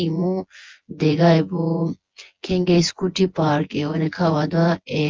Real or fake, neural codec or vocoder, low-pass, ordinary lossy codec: fake; vocoder, 24 kHz, 100 mel bands, Vocos; 7.2 kHz; Opus, 24 kbps